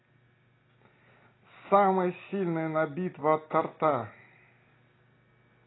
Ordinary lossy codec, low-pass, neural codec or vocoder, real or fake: AAC, 16 kbps; 7.2 kHz; none; real